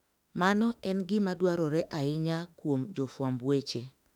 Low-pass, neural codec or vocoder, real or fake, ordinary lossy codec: 19.8 kHz; autoencoder, 48 kHz, 32 numbers a frame, DAC-VAE, trained on Japanese speech; fake; none